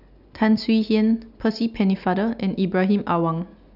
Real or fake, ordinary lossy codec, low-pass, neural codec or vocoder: real; none; 5.4 kHz; none